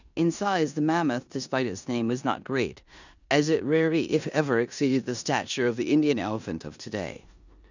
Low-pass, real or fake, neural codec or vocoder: 7.2 kHz; fake; codec, 16 kHz in and 24 kHz out, 0.9 kbps, LongCat-Audio-Codec, four codebook decoder